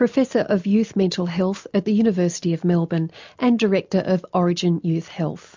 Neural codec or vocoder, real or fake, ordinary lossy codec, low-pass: none; real; MP3, 64 kbps; 7.2 kHz